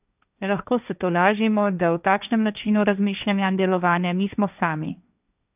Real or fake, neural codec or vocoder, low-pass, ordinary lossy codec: fake; codec, 16 kHz, 1.1 kbps, Voila-Tokenizer; 3.6 kHz; none